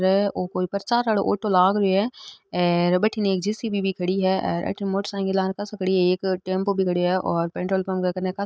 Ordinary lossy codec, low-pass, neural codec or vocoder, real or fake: none; none; none; real